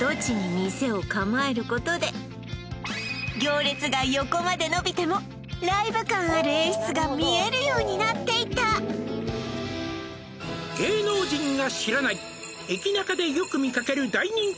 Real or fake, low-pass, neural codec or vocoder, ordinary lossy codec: real; none; none; none